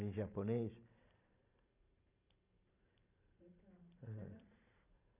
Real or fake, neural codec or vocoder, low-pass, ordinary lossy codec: fake; vocoder, 44.1 kHz, 128 mel bands every 512 samples, BigVGAN v2; 3.6 kHz; none